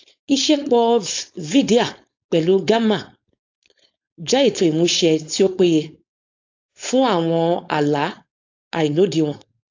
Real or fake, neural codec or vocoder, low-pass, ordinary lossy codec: fake; codec, 16 kHz, 4.8 kbps, FACodec; 7.2 kHz; AAC, 48 kbps